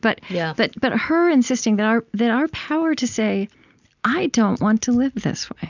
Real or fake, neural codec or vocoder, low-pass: real; none; 7.2 kHz